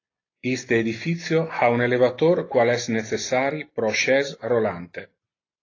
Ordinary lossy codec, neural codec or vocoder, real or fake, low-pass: AAC, 32 kbps; none; real; 7.2 kHz